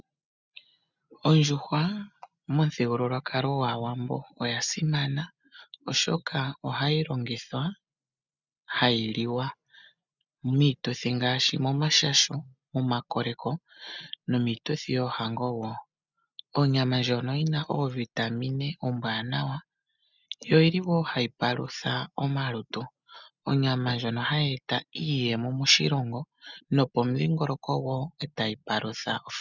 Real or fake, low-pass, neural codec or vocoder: real; 7.2 kHz; none